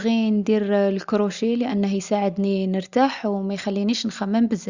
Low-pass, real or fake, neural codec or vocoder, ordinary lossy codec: 7.2 kHz; real; none; Opus, 64 kbps